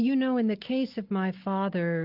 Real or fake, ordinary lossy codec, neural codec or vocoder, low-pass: real; Opus, 24 kbps; none; 5.4 kHz